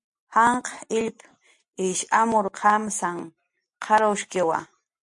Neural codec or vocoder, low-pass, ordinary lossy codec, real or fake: none; 10.8 kHz; MP3, 64 kbps; real